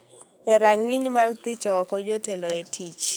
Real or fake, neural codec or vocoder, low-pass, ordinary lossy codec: fake; codec, 44.1 kHz, 2.6 kbps, SNAC; none; none